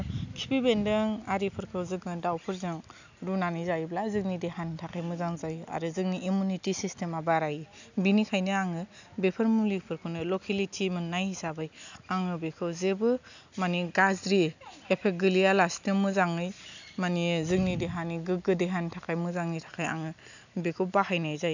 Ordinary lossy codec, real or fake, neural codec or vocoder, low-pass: none; real; none; 7.2 kHz